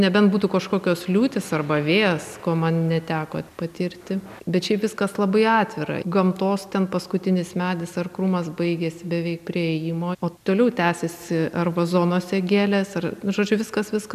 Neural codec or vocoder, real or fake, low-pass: none; real; 14.4 kHz